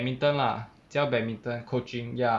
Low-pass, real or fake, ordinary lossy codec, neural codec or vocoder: none; real; none; none